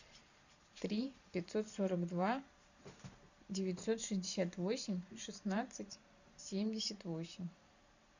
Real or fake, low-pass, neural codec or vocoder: fake; 7.2 kHz; vocoder, 44.1 kHz, 128 mel bands every 512 samples, BigVGAN v2